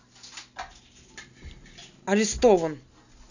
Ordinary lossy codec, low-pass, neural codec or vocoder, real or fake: none; 7.2 kHz; none; real